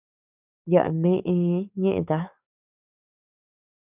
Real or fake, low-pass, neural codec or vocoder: fake; 3.6 kHz; codec, 44.1 kHz, 7.8 kbps, DAC